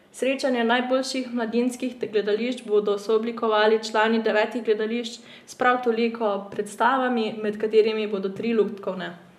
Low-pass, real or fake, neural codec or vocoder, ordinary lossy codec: 14.4 kHz; real; none; none